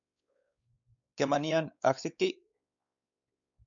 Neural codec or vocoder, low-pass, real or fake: codec, 16 kHz, 4 kbps, X-Codec, WavLM features, trained on Multilingual LibriSpeech; 7.2 kHz; fake